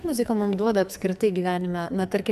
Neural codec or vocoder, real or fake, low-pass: codec, 44.1 kHz, 2.6 kbps, SNAC; fake; 14.4 kHz